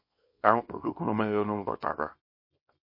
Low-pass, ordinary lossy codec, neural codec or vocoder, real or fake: 5.4 kHz; MP3, 32 kbps; codec, 24 kHz, 0.9 kbps, WavTokenizer, small release; fake